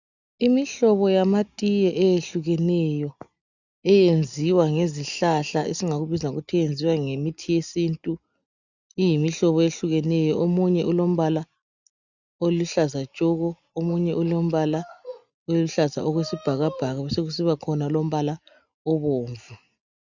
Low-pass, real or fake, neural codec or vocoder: 7.2 kHz; real; none